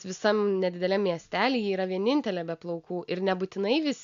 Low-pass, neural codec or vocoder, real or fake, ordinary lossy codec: 7.2 kHz; none; real; AAC, 96 kbps